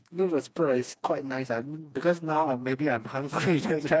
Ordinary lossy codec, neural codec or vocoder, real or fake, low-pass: none; codec, 16 kHz, 2 kbps, FreqCodec, smaller model; fake; none